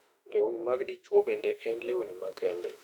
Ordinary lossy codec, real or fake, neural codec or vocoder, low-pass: none; fake; autoencoder, 48 kHz, 32 numbers a frame, DAC-VAE, trained on Japanese speech; 19.8 kHz